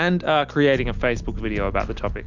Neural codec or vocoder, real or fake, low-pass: none; real; 7.2 kHz